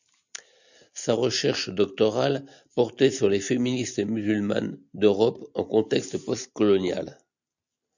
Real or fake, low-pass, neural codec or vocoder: real; 7.2 kHz; none